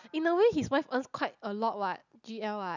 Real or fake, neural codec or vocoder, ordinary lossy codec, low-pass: real; none; none; 7.2 kHz